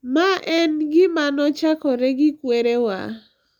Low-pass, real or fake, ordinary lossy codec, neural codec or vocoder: 19.8 kHz; real; none; none